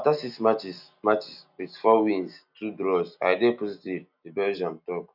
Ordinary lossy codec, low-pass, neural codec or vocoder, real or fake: none; 5.4 kHz; none; real